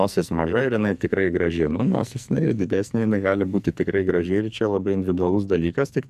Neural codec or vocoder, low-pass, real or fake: codec, 44.1 kHz, 2.6 kbps, SNAC; 14.4 kHz; fake